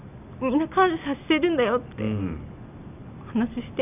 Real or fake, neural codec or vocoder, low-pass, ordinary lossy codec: real; none; 3.6 kHz; none